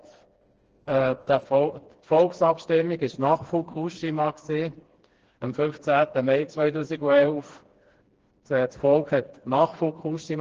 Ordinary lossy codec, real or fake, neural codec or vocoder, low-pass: Opus, 16 kbps; fake; codec, 16 kHz, 2 kbps, FreqCodec, smaller model; 7.2 kHz